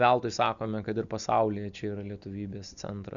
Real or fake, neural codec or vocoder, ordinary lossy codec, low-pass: real; none; MP3, 96 kbps; 7.2 kHz